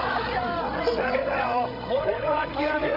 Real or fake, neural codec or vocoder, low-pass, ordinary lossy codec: fake; codec, 16 kHz, 16 kbps, FreqCodec, larger model; 5.4 kHz; none